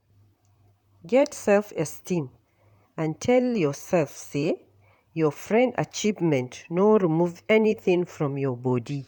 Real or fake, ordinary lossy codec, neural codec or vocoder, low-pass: fake; none; vocoder, 44.1 kHz, 128 mel bands, Pupu-Vocoder; 19.8 kHz